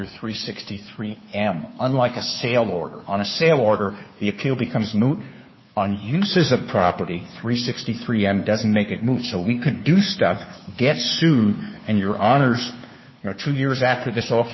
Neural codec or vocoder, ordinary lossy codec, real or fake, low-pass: codec, 16 kHz, 2 kbps, FunCodec, trained on Chinese and English, 25 frames a second; MP3, 24 kbps; fake; 7.2 kHz